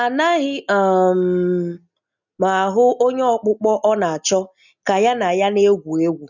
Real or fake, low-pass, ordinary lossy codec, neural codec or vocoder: real; 7.2 kHz; none; none